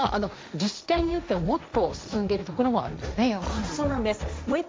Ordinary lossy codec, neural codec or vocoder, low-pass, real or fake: none; codec, 16 kHz, 1.1 kbps, Voila-Tokenizer; none; fake